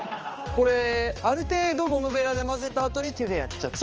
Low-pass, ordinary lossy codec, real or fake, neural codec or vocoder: 7.2 kHz; Opus, 24 kbps; fake; codec, 16 kHz, 2 kbps, X-Codec, HuBERT features, trained on balanced general audio